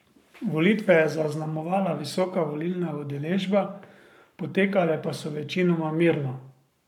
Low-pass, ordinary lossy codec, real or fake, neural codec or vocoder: 19.8 kHz; none; fake; codec, 44.1 kHz, 7.8 kbps, Pupu-Codec